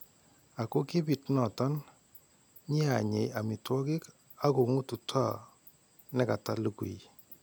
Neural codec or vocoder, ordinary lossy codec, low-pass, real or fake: none; none; none; real